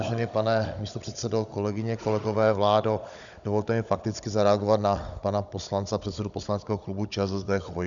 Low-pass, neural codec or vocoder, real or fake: 7.2 kHz; codec, 16 kHz, 16 kbps, FunCodec, trained on Chinese and English, 50 frames a second; fake